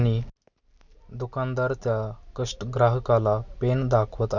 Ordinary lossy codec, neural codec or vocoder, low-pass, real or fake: none; none; 7.2 kHz; real